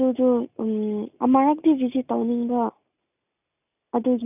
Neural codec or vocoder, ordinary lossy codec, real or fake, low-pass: none; none; real; 3.6 kHz